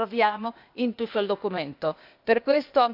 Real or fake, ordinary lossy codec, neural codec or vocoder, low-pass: fake; AAC, 48 kbps; codec, 16 kHz, 0.8 kbps, ZipCodec; 5.4 kHz